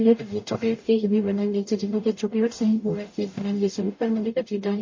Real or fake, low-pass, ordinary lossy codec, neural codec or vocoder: fake; 7.2 kHz; MP3, 32 kbps; codec, 44.1 kHz, 0.9 kbps, DAC